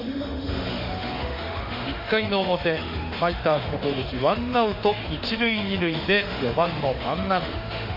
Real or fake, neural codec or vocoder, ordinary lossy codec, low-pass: fake; autoencoder, 48 kHz, 32 numbers a frame, DAC-VAE, trained on Japanese speech; MP3, 32 kbps; 5.4 kHz